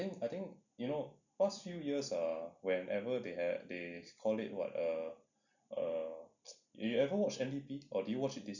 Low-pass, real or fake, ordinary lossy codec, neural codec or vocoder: 7.2 kHz; real; none; none